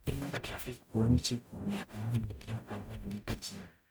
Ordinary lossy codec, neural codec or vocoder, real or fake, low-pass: none; codec, 44.1 kHz, 0.9 kbps, DAC; fake; none